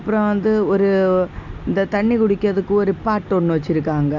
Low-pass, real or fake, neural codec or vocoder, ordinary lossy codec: 7.2 kHz; real; none; none